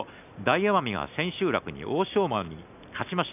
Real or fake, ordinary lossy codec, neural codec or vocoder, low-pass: real; none; none; 3.6 kHz